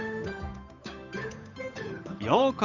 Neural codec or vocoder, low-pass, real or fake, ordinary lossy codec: codec, 16 kHz, 8 kbps, FunCodec, trained on Chinese and English, 25 frames a second; 7.2 kHz; fake; none